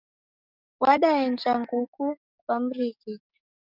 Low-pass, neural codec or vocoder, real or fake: 5.4 kHz; codec, 44.1 kHz, 7.8 kbps, DAC; fake